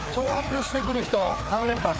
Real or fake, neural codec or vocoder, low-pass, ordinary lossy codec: fake; codec, 16 kHz, 4 kbps, FreqCodec, larger model; none; none